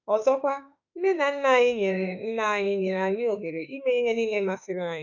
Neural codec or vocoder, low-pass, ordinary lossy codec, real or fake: autoencoder, 48 kHz, 32 numbers a frame, DAC-VAE, trained on Japanese speech; 7.2 kHz; none; fake